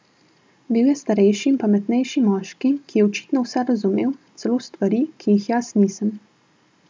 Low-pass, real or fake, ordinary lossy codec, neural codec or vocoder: none; real; none; none